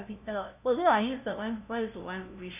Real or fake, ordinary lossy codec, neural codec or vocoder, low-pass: fake; none; codec, 16 kHz, 0.5 kbps, FunCodec, trained on LibriTTS, 25 frames a second; 3.6 kHz